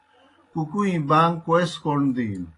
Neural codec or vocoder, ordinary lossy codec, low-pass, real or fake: none; AAC, 32 kbps; 10.8 kHz; real